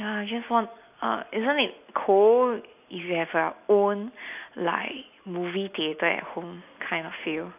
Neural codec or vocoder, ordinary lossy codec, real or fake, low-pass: none; AAC, 32 kbps; real; 3.6 kHz